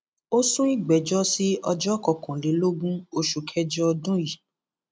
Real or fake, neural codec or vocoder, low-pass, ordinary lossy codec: real; none; none; none